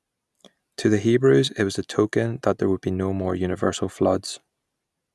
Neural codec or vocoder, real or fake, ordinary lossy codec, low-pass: none; real; none; none